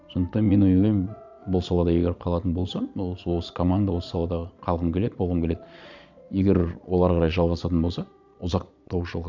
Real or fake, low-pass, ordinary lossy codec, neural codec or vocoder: fake; 7.2 kHz; none; vocoder, 22.05 kHz, 80 mel bands, Vocos